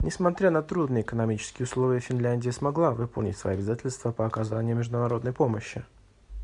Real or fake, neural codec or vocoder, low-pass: real; none; 10.8 kHz